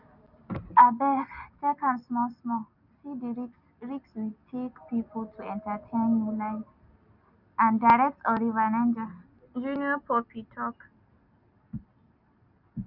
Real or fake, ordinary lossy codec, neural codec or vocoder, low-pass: real; none; none; 5.4 kHz